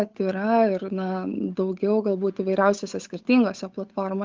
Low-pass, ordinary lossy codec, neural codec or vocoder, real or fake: 7.2 kHz; Opus, 24 kbps; codec, 16 kHz, 8 kbps, FunCodec, trained on Chinese and English, 25 frames a second; fake